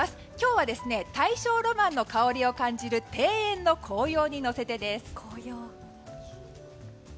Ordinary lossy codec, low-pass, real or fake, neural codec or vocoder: none; none; real; none